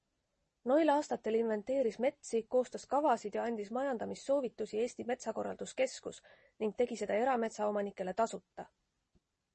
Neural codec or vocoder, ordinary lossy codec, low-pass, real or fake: none; MP3, 32 kbps; 9.9 kHz; real